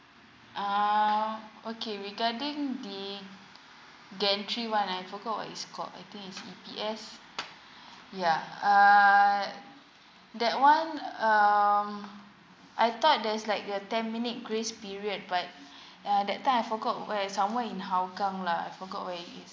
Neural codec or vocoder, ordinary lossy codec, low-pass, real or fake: none; none; none; real